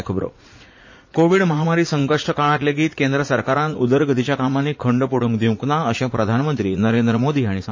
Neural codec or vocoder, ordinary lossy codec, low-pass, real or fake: vocoder, 44.1 kHz, 80 mel bands, Vocos; MP3, 32 kbps; 7.2 kHz; fake